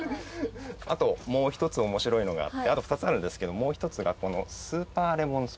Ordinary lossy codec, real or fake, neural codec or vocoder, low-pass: none; real; none; none